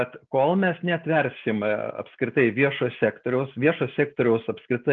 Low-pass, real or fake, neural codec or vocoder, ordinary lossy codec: 7.2 kHz; real; none; Opus, 24 kbps